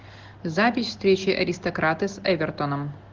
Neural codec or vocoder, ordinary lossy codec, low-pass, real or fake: none; Opus, 16 kbps; 7.2 kHz; real